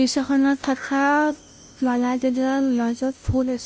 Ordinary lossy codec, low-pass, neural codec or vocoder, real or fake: none; none; codec, 16 kHz, 0.5 kbps, FunCodec, trained on Chinese and English, 25 frames a second; fake